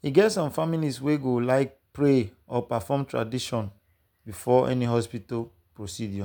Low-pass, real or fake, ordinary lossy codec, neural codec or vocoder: none; real; none; none